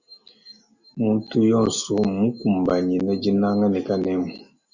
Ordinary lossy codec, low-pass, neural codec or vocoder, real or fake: Opus, 64 kbps; 7.2 kHz; none; real